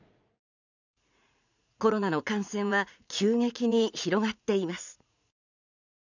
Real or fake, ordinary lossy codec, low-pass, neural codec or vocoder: fake; none; 7.2 kHz; vocoder, 44.1 kHz, 80 mel bands, Vocos